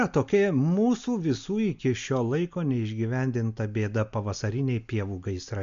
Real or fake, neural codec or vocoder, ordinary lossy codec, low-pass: real; none; MP3, 64 kbps; 7.2 kHz